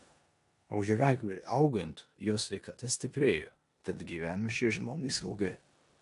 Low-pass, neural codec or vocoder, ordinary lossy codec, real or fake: 10.8 kHz; codec, 16 kHz in and 24 kHz out, 0.9 kbps, LongCat-Audio-Codec, four codebook decoder; AAC, 64 kbps; fake